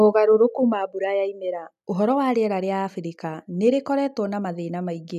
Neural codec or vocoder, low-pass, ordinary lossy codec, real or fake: none; 14.4 kHz; none; real